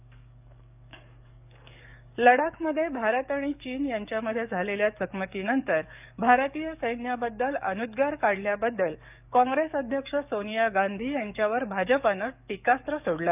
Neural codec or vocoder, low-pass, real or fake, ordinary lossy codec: codec, 44.1 kHz, 7.8 kbps, DAC; 3.6 kHz; fake; none